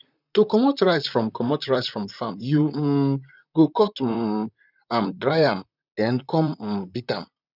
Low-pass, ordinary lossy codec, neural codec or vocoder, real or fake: 5.4 kHz; none; vocoder, 44.1 kHz, 128 mel bands, Pupu-Vocoder; fake